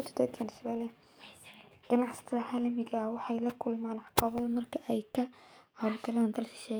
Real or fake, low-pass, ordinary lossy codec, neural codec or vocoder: fake; none; none; codec, 44.1 kHz, 7.8 kbps, DAC